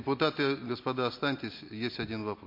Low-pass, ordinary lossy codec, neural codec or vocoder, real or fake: 5.4 kHz; MP3, 32 kbps; none; real